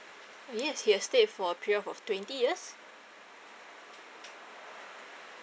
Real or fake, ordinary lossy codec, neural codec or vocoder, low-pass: real; none; none; none